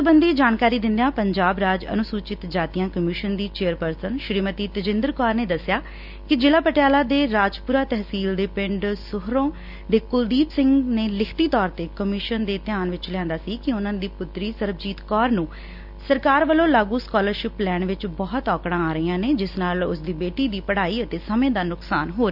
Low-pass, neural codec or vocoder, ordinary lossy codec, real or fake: 5.4 kHz; none; Opus, 64 kbps; real